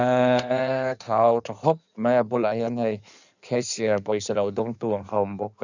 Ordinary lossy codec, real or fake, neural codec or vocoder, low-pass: none; fake; codec, 16 kHz in and 24 kHz out, 1.1 kbps, FireRedTTS-2 codec; 7.2 kHz